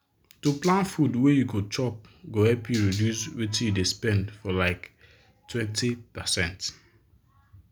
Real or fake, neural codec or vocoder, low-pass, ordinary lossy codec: real; none; none; none